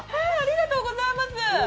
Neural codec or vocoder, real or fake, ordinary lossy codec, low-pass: none; real; none; none